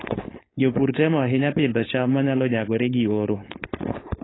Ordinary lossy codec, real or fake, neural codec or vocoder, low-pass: AAC, 16 kbps; fake; codec, 16 kHz, 4.8 kbps, FACodec; 7.2 kHz